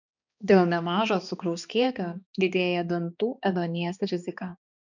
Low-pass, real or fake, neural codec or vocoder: 7.2 kHz; fake; codec, 16 kHz, 2 kbps, X-Codec, HuBERT features, trained on balanced general audio